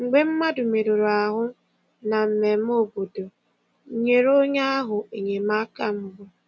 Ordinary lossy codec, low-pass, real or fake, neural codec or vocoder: none; none; real; none